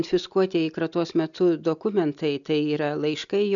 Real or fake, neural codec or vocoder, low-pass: real; none; 7.2 kHz